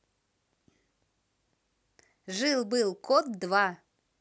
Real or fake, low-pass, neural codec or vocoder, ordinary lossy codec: real; none; none; none